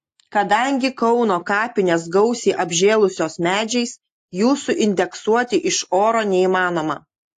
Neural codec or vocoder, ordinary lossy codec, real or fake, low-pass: none; AAC, 48 kbps; real; 14.4 kHz